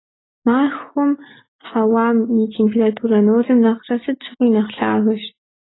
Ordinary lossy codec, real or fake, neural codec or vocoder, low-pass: AAC, 16 kbps; real; none; 7.2 kHz